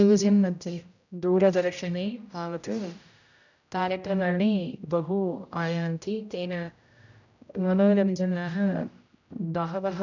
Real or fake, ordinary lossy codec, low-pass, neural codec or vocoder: fake; none; 7.2 kHz; codec, 16 kHz, 0.5 kbps, X-Codec, HuBERT features, trained on general audio